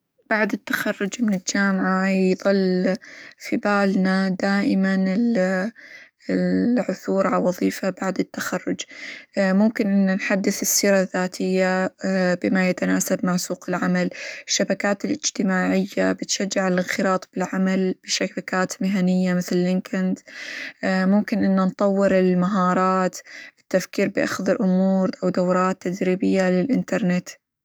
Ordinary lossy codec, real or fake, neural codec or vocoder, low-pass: none; fake; codec, 44.1 kHz, 7.8 kbps, DAC; none